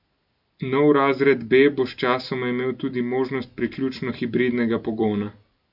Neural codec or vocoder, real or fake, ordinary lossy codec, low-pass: none; real; none; 5.4 kHz